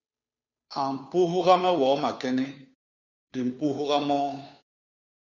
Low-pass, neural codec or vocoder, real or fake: 7.2 kHz; codec, 16 kHz, 2 kbps, FunCodec, trained on Chinese and English, 25 frames a second; fake